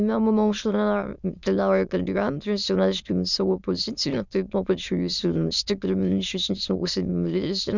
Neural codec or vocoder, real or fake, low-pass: autoencoder, 22.05 kHz, a latent of 192 numbers a frame, VITS, trained on many speakers; fake; 7.2 kHz